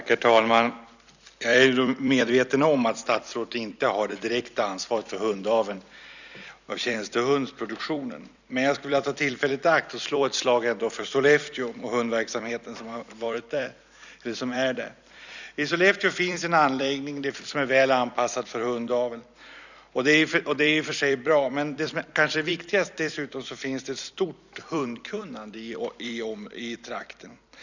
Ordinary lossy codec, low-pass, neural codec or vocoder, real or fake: none; 7.2 kHz; vocoder, 44.1 kHz, 128 mel bands every 256 samples, BigVGAN v2; fake